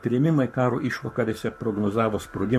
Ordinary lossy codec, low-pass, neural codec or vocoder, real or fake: MP3, 64 kbps; 14.4 kHz; codec, 44.1 kHz, 7.8 kbps, Pupu-Codec; fake